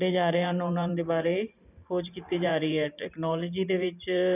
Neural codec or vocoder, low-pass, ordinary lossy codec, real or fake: vocoder, 44.1 kHz, 128 mel bands every 512 samples, BigVGAN v2; 3.6 kHz; none; fake